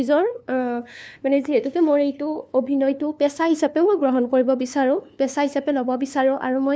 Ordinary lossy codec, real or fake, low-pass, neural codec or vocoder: none; fake; none; codec, 16 kHz, 2 kbps, FunCodec, trained on LibriTTS, 25 frames a second